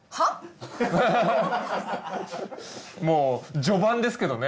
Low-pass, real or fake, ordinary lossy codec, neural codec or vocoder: none; real; none; none